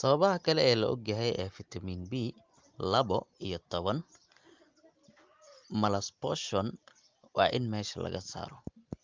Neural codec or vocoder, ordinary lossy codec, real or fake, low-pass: none; Opus, 24 kbps; real; 7.2 kHz